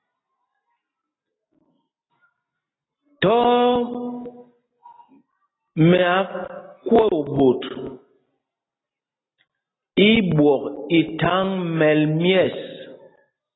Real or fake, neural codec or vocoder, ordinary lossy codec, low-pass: real; none; AAC, 16 kbps; 7.2 kHz